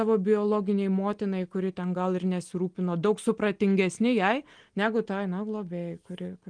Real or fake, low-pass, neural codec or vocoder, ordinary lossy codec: real; 9.9 kHz; none; Opus, 24 kbps